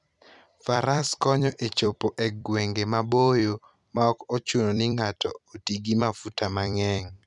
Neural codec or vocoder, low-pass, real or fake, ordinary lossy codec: vocoder, 44.1 kHz, 128 mel bands every 256 samples, BigVGAN v2; 10.8 kHz; fake; none